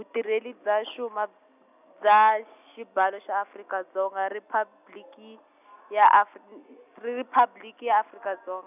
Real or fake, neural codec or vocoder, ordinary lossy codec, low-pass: real; none; none; 3.6 kHz